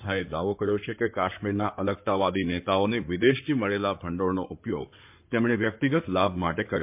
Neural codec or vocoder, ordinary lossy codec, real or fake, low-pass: codec, 16 kHz in and 24 kHz out, 2.2 kbps, FireRedTTS-2 codec; MP3, 32 kbps; fake; 3.6 kHz